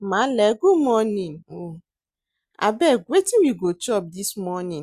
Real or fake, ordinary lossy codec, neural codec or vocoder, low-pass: real; Opus, 64 kbps; none; 14.4 kHz